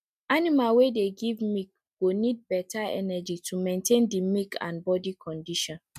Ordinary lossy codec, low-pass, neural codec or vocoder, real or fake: MP3, 96 kbps; 14.4 kHz; none; real